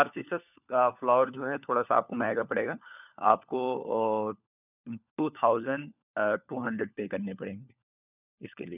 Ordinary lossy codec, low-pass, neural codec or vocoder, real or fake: none; 3.6 kHz; codec, 16 kHz, 4 kbps, FunCodec, trained on LibriTTS, 50 frames a second; fake